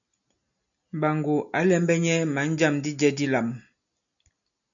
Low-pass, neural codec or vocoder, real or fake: 7.2 kHz; none; real